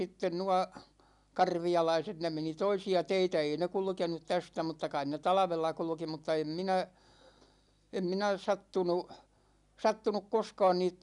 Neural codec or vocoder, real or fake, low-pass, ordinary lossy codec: none; real; 10.8 kHz; none